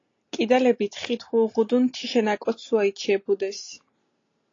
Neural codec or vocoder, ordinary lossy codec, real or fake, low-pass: none; AAC, 32 kbps; real; 7.2 kHz